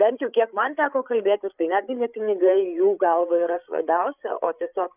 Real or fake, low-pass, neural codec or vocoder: fake; 3.6 kHz; codec, 16 kHz, 8 kbps, FreqCodec, larger model